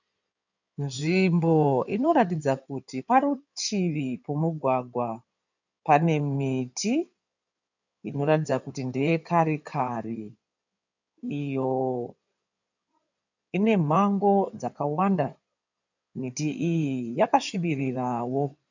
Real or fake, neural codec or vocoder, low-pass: fake; codec, 16 kHz in and 24 kHz out, 2.2 kbps, FireRedTTS-2 codec; 7.2 kHz